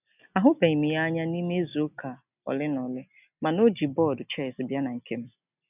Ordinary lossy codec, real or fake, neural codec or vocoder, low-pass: none; real; none; 3.6 kHz